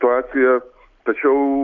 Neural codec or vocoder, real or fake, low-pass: none; real; 7.2 kHz